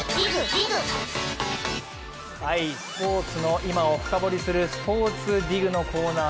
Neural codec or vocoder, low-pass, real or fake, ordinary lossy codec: none; none; real; none